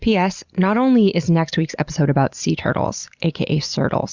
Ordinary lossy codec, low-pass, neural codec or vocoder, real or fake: Opus, 64 kbps; 7.2 kHz; none; real